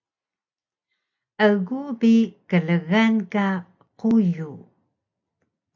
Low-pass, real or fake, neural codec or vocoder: 7.2 kHz; real; none